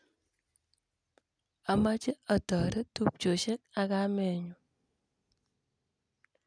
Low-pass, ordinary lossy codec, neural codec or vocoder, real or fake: 9.9 kHz; none; none; real